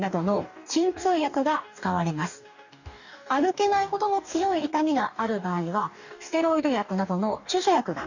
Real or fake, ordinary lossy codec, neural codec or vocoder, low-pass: fake; none; codec, 44.1 kHz, 2.6 kbps, DAC; 7.2 kHz